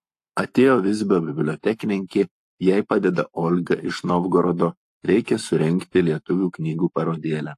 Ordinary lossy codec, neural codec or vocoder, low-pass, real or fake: AAC, 64 kbps; codec, 44.1 kHz, 7.8 kbps, Pupu-Codec; 14.4 kHz; fake